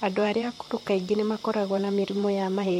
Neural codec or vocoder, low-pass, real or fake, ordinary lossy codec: vocoder, 44.1 kHz, 128 mel bands, Pupu-Vocoder; 19.8 kHz; fake; MP3, 64 kbps